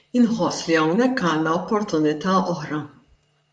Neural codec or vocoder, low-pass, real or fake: vocoder, 44.1 kHz, 128 mel bands, Pupu-Vocoder; 10.8 kHz; fake